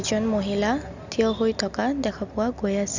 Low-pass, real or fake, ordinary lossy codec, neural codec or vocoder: 7.2 kHz; real; Opus, 64 kbps; none